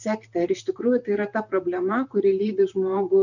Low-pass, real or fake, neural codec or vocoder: 7.2 kHz; fake; vocoder, 44.1 kHz, 128 mel bands, Pupu-Vocoder